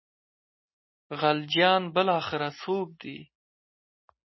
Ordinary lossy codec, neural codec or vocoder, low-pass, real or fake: MP3, 24 kbps; none; 7.2 kHz; real